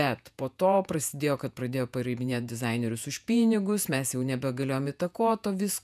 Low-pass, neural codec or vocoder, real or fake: 14.4 kHz; vocoder, 48 kHz, 128 mel bands, Vocos; fake